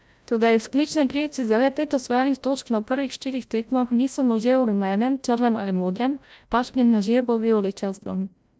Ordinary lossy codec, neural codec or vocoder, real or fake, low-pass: none; codec, 16 kHz, 0.5 kbps, FreqCodec, larger model; fake; none